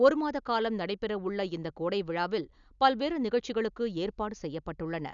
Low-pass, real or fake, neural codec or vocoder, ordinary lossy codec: 7.2 kHz; real; none; none